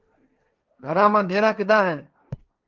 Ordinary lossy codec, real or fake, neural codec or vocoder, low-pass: Opus, 32 kbps; fake; codec, 16 kHz, 1.1 kbps, Voila-Tokenizer; 7.2 kHz